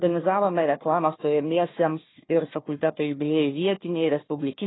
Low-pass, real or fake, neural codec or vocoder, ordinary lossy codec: 7.2 kHz; fake; codec, 16 kHz, 1 kbps, FunCodec, trained on Chinese and English, 50 frames a second; AAC, 16 kbps